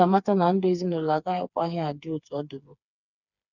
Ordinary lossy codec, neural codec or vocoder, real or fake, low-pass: none; codec, 16 kHz, 4 kbps, FreqCodec, smaller model; fake; 7.2 kHz